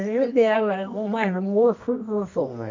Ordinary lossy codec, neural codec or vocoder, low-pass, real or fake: MP3, 64 kbps; codec, 24 kHz, 0.9 kbps, WavTokenizer, medium music audio release; 7.2 kHz; fake